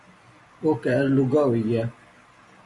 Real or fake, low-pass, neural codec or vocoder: fake; 10.8 kHz; vocoder, 44.1 kHz, 128 mel bands every 256 samples, BigVGAN v2